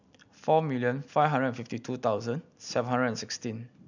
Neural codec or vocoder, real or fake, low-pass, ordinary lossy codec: none; real; 7.2 kHz; none